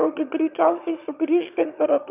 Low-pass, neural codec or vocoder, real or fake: 3.6 kHz; autoencoder, 22.05 kHz, a latent of 192 numbers a frame, VITS, trained on one speaker; fake